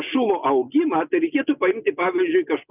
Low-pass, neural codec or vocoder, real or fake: 3.6 kHz; none; real